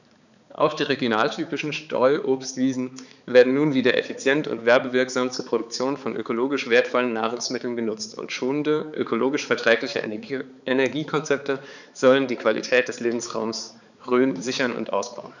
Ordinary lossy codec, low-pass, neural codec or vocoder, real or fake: none; 7.2 kHz; codec, 16 kHz, 4 kbps, X-Codec, HuBERT features, trained on balanced general audio; fake